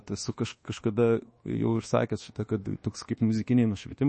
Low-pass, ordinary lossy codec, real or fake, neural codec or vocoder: 10.8 kHz; MP3, 32 kbps; fake; codec, 24 kHz, 1.2 kbps, DualCodec